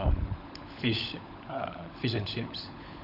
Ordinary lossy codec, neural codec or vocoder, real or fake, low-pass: none; codec, 16 kHz, 16 kbps, FunCodec, trained on LibriTTS, 50 frames a second; fake; 5.4 kHz